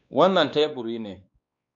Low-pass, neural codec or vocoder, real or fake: 7.2 kHz; codec, 16 kHz, 2 kbps, X-Codec, WavLM features, trained on Multilingual LibriSpeech; fake